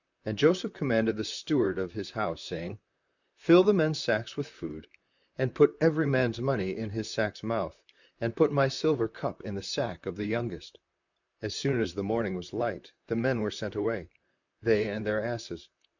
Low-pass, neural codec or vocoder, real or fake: 7.2 kHz; vocoder, 44.1 kHz, 128 mel bands, Pupu-Vocoder; fake